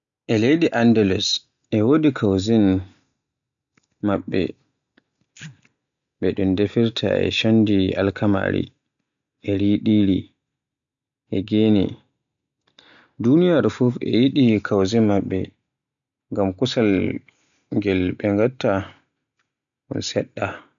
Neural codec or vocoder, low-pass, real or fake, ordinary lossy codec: none; 7.2 kHz; real; none